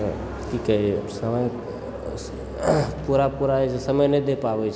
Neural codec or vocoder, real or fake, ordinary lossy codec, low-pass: none; real; none; none